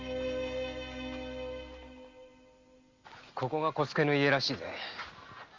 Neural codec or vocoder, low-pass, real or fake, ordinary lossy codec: none; 7.2 kHz; real; Opus, 32 kbps